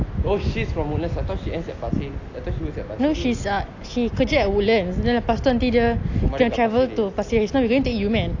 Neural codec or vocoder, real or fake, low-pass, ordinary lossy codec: none; real; 7.2 kHz; AAC, 48 kbps